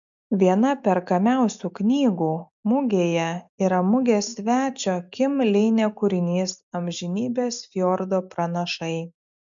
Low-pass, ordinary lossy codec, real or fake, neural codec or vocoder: 7.2 kHz; MP3, 64 kbps; real; none